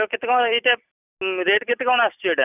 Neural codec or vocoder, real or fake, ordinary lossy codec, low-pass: none; real; none; 3.6 kHz